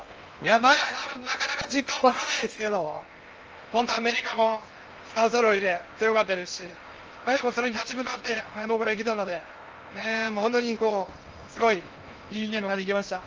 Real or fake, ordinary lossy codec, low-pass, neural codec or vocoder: fake; Opus, 24 kbps; 7.2 kHz; codec, 16 kHz in and 24 kHz out, 0.6 kbps, FocalCodec, streaming, 2048 codes